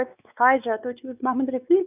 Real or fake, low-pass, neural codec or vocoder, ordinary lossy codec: fake; 3.6 kHz; codec, 16 kHz, 4 kbps, X-Codec, WavLM features, trained on Multilingual LibriSpeech; none